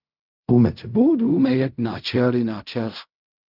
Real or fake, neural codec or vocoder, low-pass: fake; codec, 16 kHz in and 24 kHz out, 0.4 kbps, LongCat-Audio-Codec, fine tuned four codebook decoder; 5.4 kHz